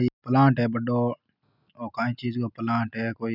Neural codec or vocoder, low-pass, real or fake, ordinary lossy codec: none; 5.4 kHz; real; none